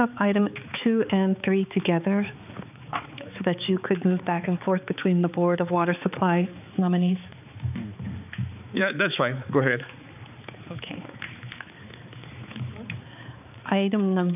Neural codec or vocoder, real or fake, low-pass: codec, 16 kHz, 4 kbps, X-Codec, HuBERT features, trained on balanced general audio; fake; 3.6 kHz